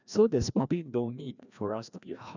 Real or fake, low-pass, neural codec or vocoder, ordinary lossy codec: fake; 7.2 kHz; codec, 16 kHz, 1 kbps, FreqCodec, larger model; none